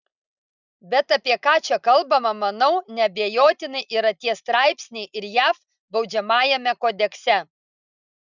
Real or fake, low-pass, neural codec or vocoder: real; 7.2 kHz; none